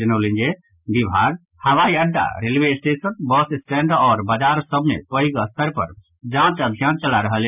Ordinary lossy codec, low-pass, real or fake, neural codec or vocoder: none; 3.6 kHz; real; none